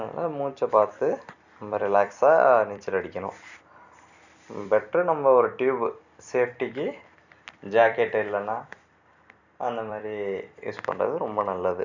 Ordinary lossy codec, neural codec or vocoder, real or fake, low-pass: none; vocoder, 44.1 kHz, 128 mel bands every 256 samples, BigVGAN v2; fake; 7.2 kHz